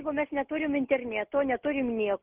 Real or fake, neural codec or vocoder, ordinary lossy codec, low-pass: real; none; Opus, 24 kbps; 3.6 kHz